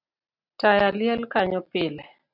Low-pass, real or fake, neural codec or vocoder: 5.4 kHz; real; none